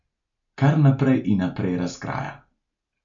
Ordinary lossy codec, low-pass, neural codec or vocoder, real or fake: none; 7.2 kHz; none; real